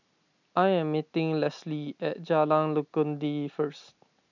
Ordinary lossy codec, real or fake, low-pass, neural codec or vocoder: none; real; 7.2 kHz; none